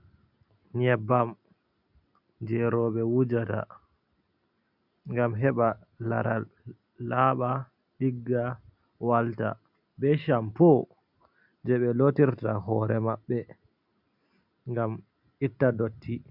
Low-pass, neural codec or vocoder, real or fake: 5.4 kHz; none; real